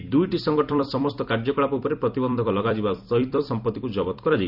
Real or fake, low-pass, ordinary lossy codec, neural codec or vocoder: fake; 5.4 kHz; none; vocoder, 44.1 kHz, 128 mel bands every 256 samples, BigVGAN v2